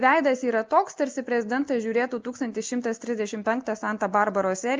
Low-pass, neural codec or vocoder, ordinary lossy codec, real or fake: 7.2 kHz; none; Opus, 32 kbps; real